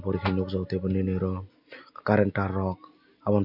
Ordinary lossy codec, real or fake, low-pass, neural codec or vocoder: none; real; 5.4 kHz; none